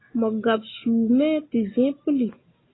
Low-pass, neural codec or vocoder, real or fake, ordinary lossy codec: 7.2 kHz; none; real; AAC, 16 kbps